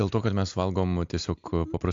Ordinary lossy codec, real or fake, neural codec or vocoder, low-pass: AAC, 64 kbps; real; none; 7.2 kHz